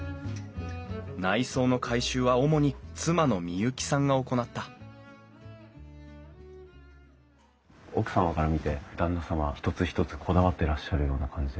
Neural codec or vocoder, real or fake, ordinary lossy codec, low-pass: none; real; none; none